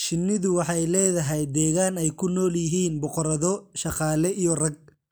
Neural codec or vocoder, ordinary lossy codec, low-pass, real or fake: none; none; none; real